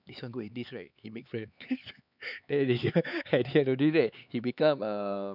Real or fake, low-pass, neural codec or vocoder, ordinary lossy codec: fake; 5.4 kHz; codec, 16 kHz, 4 kbps, X-Codec, HuBERT features, trained on LibriSpeech; none